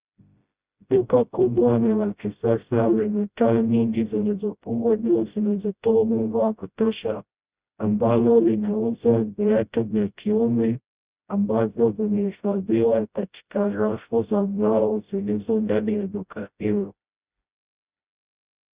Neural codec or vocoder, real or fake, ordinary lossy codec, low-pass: codec, 16 kHz, 0.5 kbps, FreqCodec, smaller model; fake; Opus, 64 kbps; 3.6 kHz